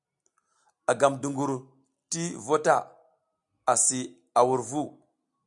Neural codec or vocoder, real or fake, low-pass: none; real; 10.8 kHz